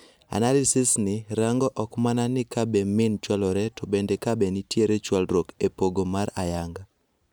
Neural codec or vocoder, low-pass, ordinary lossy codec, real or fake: none; none; none; real